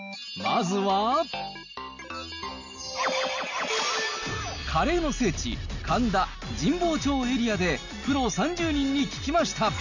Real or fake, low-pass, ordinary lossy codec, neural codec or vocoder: real; 7.2 kHz; none; none